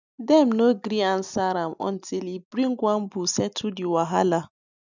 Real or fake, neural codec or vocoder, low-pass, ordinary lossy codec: real; none; 7.2 kHz; none